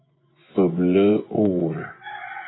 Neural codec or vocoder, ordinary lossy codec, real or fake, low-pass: vocoder, 24 kHz, 100 mel bands, Vocos; AAC, 16 kbps; fake; 7.2 kHz